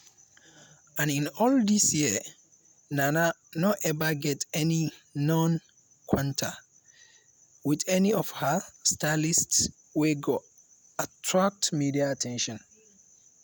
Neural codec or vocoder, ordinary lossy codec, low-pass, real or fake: none; none; none; real